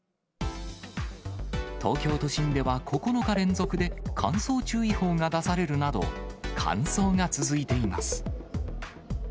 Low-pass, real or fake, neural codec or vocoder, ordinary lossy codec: none; real; none; none